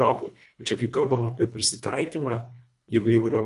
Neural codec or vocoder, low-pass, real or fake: codec, 24 kHz, 1.5 kbps, HILCodec; 10.8 kHz; fake